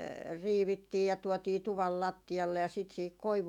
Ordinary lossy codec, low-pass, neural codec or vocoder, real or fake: none; 19.8 kHz; autoencoder, 48 kHz, 128 numbers a frame, DAC-VAE, trained on Japanese speech; fake